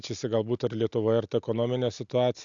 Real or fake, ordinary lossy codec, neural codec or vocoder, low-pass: real; AAC, 64 kbps; none; 7.2 kHz